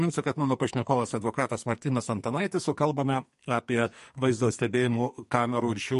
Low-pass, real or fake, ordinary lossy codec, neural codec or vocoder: 14.4 kHz; fake; MP3, 48 kbps; codec, 44.1 kHz, 2.6 kbps, SNAC